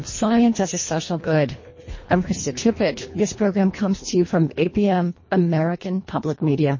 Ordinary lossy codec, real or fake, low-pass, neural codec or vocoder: MP3, 32 kbps; fake; 7.2 kHz; codec, 24 kHz, 1.5 kbps, HILCodec